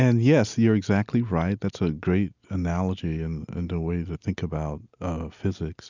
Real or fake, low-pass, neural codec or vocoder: real; 7.2 kHz; none